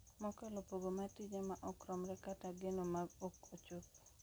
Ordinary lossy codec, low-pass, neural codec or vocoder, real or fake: none; none; none; real